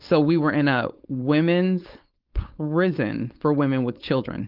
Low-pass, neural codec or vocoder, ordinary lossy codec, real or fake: 5.4 kHz; codec, 16 kHz, 4.8 kbps, FACodec; Opus, 32 kbps; fake